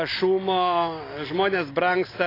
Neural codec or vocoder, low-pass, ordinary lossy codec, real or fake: none; 5.4 kHz; AAC, 24 kbps; real